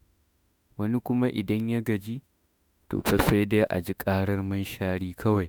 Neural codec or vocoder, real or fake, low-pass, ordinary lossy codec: autoencoder, 48 kHz, 32 numbers a frame, DAC-VAE, trained on Japanese speech; fake; none; none